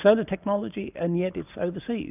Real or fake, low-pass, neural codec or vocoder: real; 3.6 kHz; none